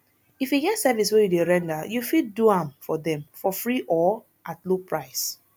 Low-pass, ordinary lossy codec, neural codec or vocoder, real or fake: none; none; none; real